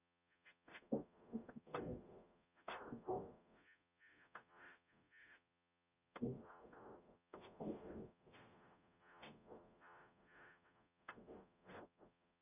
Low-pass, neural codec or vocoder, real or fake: 3.6 kHz; codec, 44.1 kHz, 0.9 kbps, DAC; fake